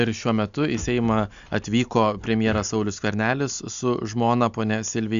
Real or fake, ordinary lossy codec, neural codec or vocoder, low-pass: real; AAC, 96 kbps; none; 7.2 kHz